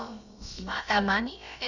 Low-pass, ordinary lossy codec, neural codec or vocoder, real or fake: 7.2 kHz; none; codec, 16 kHz, about 1 kbps, DyCAST, with the encoder's durations; fake